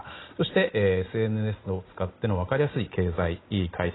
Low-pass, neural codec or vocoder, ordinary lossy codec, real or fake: 7.2 kHz; none; AAC, 16 kbps; real